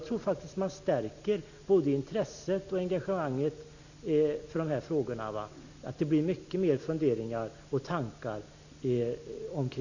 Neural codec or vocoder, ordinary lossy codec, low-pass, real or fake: none; none; 7.2 kHz; real